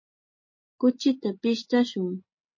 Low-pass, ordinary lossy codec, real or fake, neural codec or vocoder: 7.2 kHz; MP3, 32 kbps; real; none